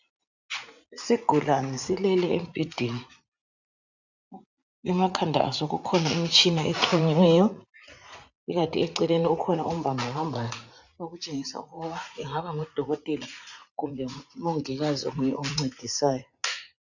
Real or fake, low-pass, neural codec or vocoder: fake; 7.2 kHz; vocoder, 44.1 kHz, 80 mel bands, Vocos